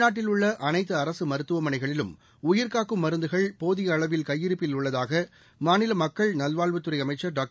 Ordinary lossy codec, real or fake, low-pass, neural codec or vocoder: none; real; none; none